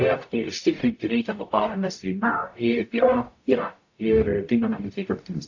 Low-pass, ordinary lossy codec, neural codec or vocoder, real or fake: 7.2 kHz; AAC, 48 kbps; codec, 44.1 kHz, 0.9 kbps, DAC; fake